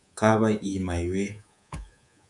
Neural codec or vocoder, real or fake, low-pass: codec, 24 kHz, 3.1 kbps, DualCodec; fake; 10.8 kHz